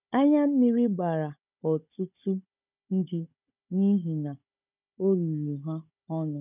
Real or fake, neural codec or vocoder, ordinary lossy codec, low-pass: fake; codec, 16 kHz, 16 kbps, FunCodec, trained on Chinese and English, 50 frames a second; none; 3.6 kHz